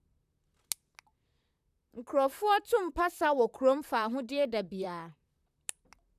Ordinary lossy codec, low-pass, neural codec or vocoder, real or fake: none; 14.4 kHz; vocoder, 44.1 kHz, 128 mel bands, Pupu-Vocoder; fake